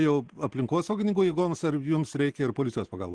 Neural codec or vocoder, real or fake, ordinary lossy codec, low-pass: none; real; Opus, 16 kbps; 9.9 kHz